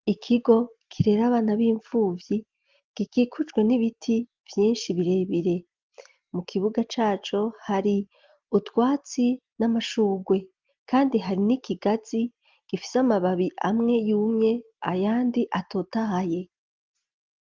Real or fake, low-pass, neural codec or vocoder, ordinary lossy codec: real; 7.2 kHz; none; Opus, 32 kbps